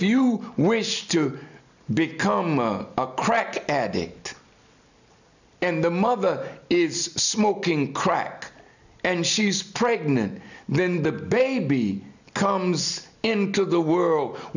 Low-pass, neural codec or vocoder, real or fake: 7.2 kHz; none; real